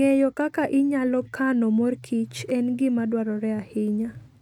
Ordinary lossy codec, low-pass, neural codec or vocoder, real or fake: none; 19.8 kHz; none; real